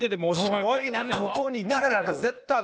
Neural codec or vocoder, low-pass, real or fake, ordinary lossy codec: codec, 16 kHz, 0.8 kbps, ZipCodec; none; fake; none